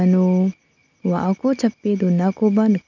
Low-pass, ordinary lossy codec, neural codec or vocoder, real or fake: 7.2 kHz; none; none; real